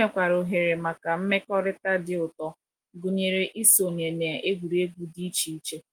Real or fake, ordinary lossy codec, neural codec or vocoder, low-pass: real; Opus, 32 kbps; none; 19.8 kHz